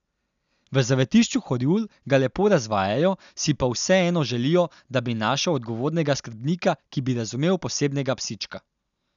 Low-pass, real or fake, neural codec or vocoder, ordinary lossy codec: 7.2 kHz; real; none; none